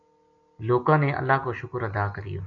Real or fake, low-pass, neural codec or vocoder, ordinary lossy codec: real; 7.2 kHz; none; MP3, 64 kbps